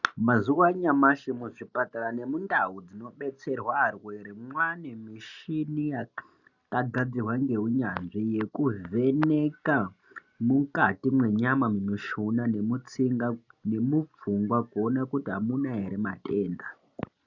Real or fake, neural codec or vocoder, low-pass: real; none; 7.2 kHz